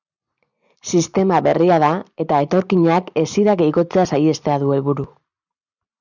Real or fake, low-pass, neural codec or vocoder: real; 7.2 kHz; none